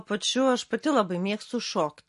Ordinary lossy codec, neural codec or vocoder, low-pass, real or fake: MP3, 48 kbps; none; 14.4 kHz; real